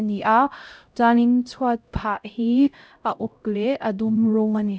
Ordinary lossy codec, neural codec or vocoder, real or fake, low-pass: none; codec, 16 kHz, 0.5 kbps, X-Codec, HuBERT features, trained on LibriSpeech; fake; none